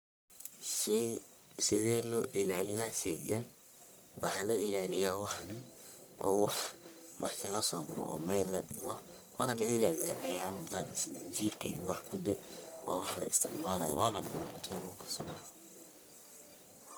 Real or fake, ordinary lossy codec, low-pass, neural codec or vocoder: fake; none; none; codec, 44.1 kHz, 1.7 kbps, Pupu-Codec